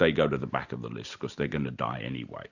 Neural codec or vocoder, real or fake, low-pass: none; real; 7.2 kHz